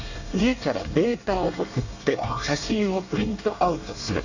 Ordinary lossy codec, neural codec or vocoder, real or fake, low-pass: none; codec, 24 kHz, 1 kbps, SNAC; fake; 7.2 kHz